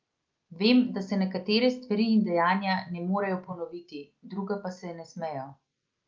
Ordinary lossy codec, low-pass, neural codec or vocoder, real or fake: Opus, 24 kbps; 7.2 kHz; none; real